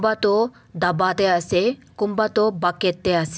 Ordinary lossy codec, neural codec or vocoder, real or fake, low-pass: none; none; real; none